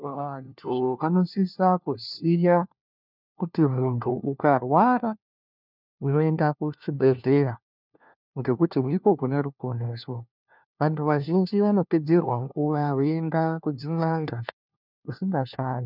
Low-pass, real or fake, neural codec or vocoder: 5.4 kHz; fake; codec, 16 kHz, 1 kbps, FunCodec, trained on LibriTTS, 50 frames a second